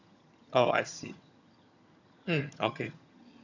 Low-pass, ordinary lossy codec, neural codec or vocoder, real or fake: 7.2 kHz; none; vocoder, 22.05 kHz, 80 mel bands, HiFi-GAN; fake